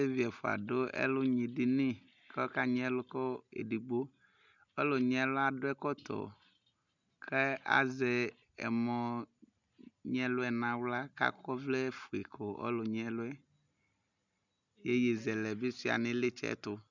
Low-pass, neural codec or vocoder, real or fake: 7.2 kHz; none; real